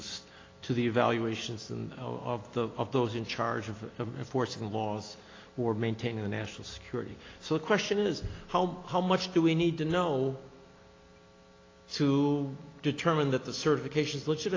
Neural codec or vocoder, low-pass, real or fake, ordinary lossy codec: none; 7.2 kHz; real; AAC, 32 kbps